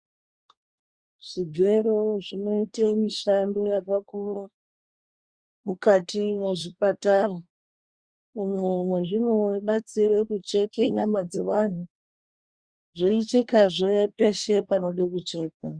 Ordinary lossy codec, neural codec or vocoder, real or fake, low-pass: Opus, 64 kbps; codec, 24 kHz, 1 kbps, SNAC; fake; 9.9 kHz